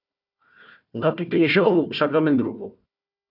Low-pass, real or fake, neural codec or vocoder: 5.4 kHz; fake; codec, 16 kHz, 1 kbps, FunCodec, trained on Chinese and English, 50 frames a second